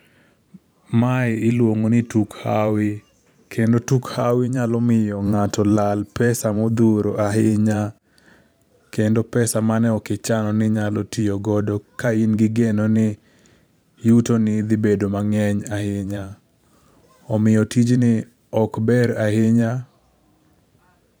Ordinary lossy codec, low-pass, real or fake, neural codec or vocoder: none; none; fake; vocoder, 44.1 kHz, 128 mel bands every 512 samples, BigVGAN v2